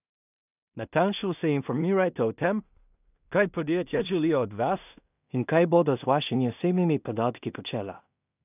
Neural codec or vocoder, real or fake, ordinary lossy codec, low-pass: codec, 16 kHz in and 24 kHz out, 0.4 kbps, LongCat-Audio-Codec, two codebook decoder; fake; none; 3.6 kHz